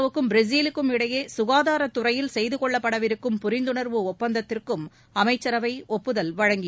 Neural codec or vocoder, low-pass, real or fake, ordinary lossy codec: none; none; real; none